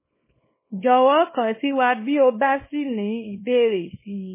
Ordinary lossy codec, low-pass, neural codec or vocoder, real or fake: MP3, 16 kbps; 3.6 kHz; codec, 24 kHz, 0.9 kbps, WavTokenizer, small release; fake